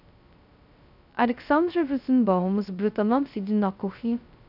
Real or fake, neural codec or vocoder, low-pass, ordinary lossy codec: fake; codec, 16 kHz, 0.2 kbps, FocalCodec; 5.4 kHz; none